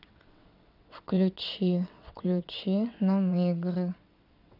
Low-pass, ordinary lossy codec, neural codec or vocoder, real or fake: 5.4 kHz; none; vocoder, 22.05 kHz, 80 mel bands, WaveNeXt; fake